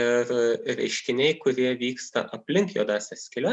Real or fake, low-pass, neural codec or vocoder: real; 10.8 kHz; none